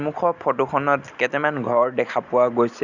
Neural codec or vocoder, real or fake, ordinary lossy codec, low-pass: none; real; none; 7.2 kHz